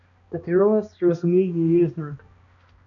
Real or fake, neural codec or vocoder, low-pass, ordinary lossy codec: fake; codec, 16 kHz, 1 kbps, X-Codec, HuBERT features, trained on balanced general audio; 7.2 kHz; AAC, 64 kbps